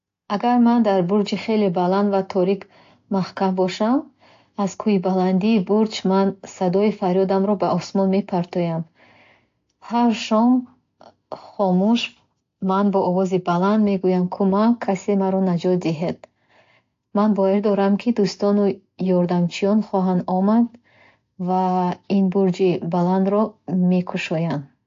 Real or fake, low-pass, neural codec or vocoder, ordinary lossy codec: real; 7.2 kHz; none; AAC, 48 kbps